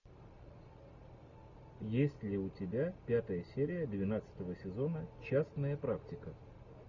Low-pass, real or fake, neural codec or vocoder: 7.2 kHz; real; none